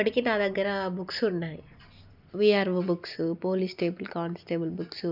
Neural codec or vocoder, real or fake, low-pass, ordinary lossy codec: none; real; 5.4 kHz; none